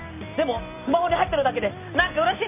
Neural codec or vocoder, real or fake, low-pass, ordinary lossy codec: none; real; 3.6 kHz; none